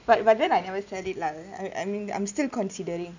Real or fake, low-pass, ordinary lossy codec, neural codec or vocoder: real; 7.2 kHz; none; none